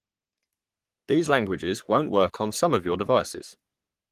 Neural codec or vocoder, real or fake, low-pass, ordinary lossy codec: codec, 44.1 kHz, 3.4 kbps, Pupu-Codec; fake; 14.4 kHz; Opus, 24 kbps